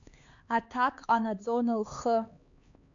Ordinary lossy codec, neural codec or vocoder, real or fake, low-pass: AAC, 64 kbps; codec, 16 kHz, 2 kbps, X-Codec, HuBERT features, trained on LibriSpeech; fake; 7.2 kHz